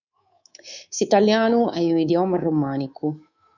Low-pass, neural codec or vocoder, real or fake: 7.2 kHz; codec, 24 kHz, 3.1 kbps, DualCodec; fake